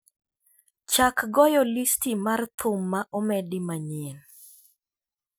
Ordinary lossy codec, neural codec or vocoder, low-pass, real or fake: none; none; none; real